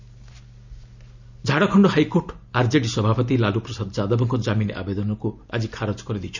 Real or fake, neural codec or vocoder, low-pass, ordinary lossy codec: real; none; 7.2 kHz; none